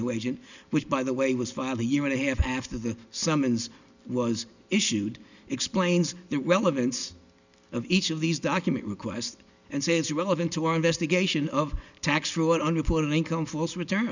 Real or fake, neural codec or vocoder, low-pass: real; none; 7.2 kHz